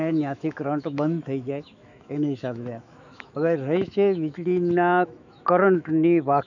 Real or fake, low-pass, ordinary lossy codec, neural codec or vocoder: fake; 7.2 kHz; none; autoencoder, 48 kHz, 128 numbers a frame, DAC-VAE, trained on Japanese speech